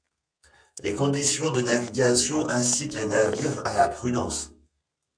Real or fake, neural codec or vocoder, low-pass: fake; codec, 32 kHz, 1.9 kbps, SNAC; 9.9 kHz